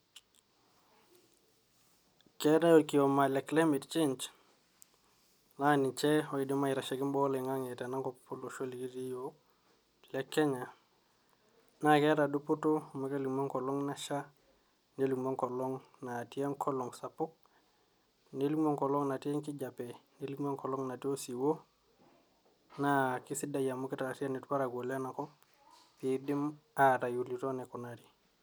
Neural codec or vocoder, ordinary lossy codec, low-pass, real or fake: none; none; none; real